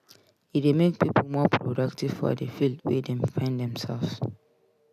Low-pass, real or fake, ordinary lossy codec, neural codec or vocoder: 14.4 kHz; real; MP3, 96 kbps; none